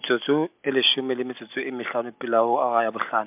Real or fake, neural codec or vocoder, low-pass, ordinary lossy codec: real; none; 3.6 kHz; MP3, 32 kbps